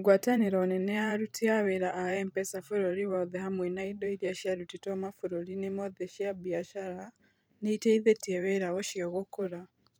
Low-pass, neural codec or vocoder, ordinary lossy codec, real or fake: none; vocoder, 44.1 kHz, 128 mel bands every 512 samples, BigVGAN v2; none; fake